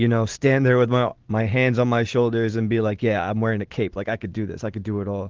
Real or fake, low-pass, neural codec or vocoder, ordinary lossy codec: real; 7.2 kHz; none; Opus, 16 kbps